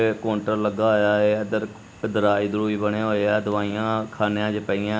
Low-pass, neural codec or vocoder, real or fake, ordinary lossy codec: none; none; real; none